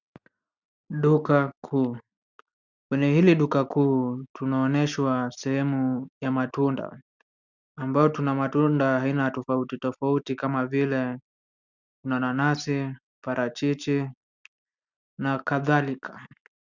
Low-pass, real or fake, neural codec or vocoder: 7.2 kHz; real; none